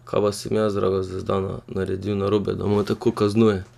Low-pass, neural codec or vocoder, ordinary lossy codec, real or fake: 14.4 kHz; none; none; real